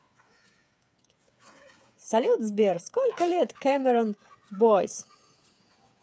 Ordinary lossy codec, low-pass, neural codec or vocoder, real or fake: none; none; codec, 16 kHz, 8 kbps, FreqCodec, smaller model; fake